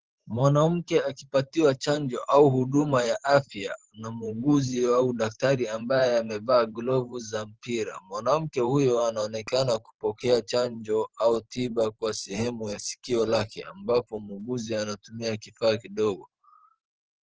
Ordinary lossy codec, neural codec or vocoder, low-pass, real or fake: Opus, 16 kbps; vocoder, 44.1 kHz, 128 mel bands every 512 samples, BigVGAN v2; 7.2 kHz; fake